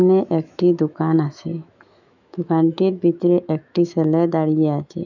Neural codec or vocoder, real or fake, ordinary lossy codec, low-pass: autoencoder, 48 kHz, 128 numbers a frame, DAC-VAE, trained on Japanese speech; fake; none; 7.2 kHz